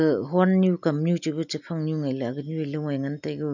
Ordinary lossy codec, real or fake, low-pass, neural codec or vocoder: none; real; 7.2 kHz; none